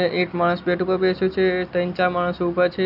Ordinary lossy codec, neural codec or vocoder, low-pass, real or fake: none; none; 5.4 kHz; real